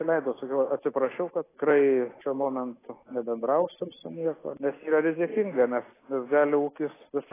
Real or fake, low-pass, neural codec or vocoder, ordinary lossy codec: real; 3.6 kHz; none; AAC, 16 kbps